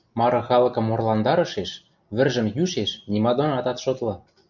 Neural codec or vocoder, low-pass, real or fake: none; 7.2 kHz; real